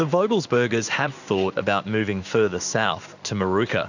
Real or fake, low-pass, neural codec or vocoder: fake; 7.2 kHz; codec, 16 kHz in and 24 kHz out, 1 kbps, XY-Tokenizer